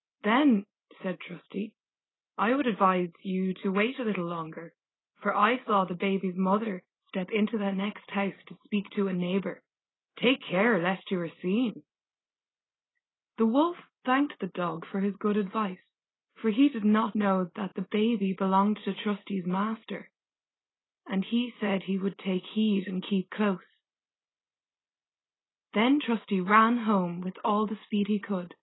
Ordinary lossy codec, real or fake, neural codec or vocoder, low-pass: AAC, 16 kbps; real; none; 7.2 kHz